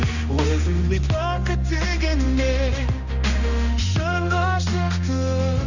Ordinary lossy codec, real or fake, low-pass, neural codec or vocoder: none; fake; 7.2 kHz; codec, 16 kHz, 1 kbps, X-Codec, HuBERT features, trained on general audio